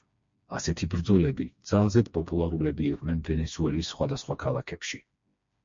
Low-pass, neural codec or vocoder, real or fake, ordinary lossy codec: 7.2 kHz; codec, 16 kHz, 2 kbps, FreqCodec, smaller model; fake; MP3, 48 kbps